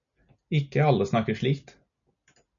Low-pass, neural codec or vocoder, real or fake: 7.2 kHz; none; real